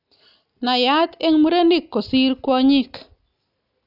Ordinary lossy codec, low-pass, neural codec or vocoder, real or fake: none; 5.4 kHz; none; real